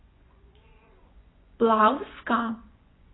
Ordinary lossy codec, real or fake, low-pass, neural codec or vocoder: AAC, 16 kbps; fake; 7.2 kHz; vocoder, 24 kHz, 100 mel bands, Vocos